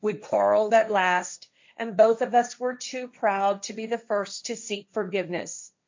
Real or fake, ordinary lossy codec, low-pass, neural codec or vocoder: fake; MP3, 48 kbps; 7.2 kHz; codec, 16 kHz, 1.1 kbps, Voila-Tokenizer